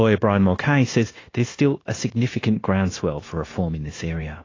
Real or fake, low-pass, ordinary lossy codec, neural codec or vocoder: fake; 7.2 kHz; AAC, 32 kbps; codec, 16 kHz, 0.9 kbps, LongCat-Audio-Codec